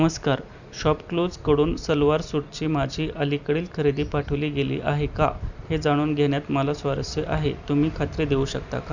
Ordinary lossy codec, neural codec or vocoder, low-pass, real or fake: none; vocoder, 44.1 kHz, 128 mel bands every 256 samples, BigVGAN v2; 7.2 kHz; fake